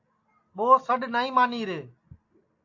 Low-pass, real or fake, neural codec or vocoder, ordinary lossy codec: 7.2 kHz; real; none; AAC, 48 kbps